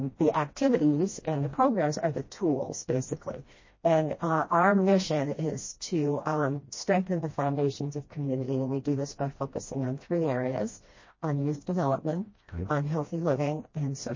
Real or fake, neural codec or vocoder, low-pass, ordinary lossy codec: fake; codec, 16 kHz, 1 kbps, FreqCodec, smaller model; 7.2 kHz; MP3, 32 kbps